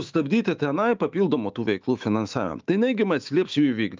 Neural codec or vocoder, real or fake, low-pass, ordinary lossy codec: autoencoder, 48 kHz, 128 numbers a frame, DAC-VAE, trained on Japanese speech; fake; 7.2 kHz; Opus, 24 kbps